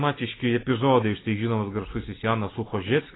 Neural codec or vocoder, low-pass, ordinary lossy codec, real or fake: none; 7.2 kHz; AAC, 16 kbps; real